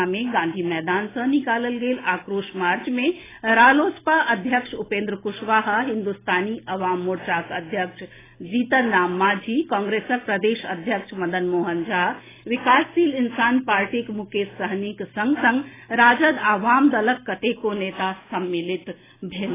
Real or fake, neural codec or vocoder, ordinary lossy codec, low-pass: real; none; AAC, 16 kbps; 3.6 kHz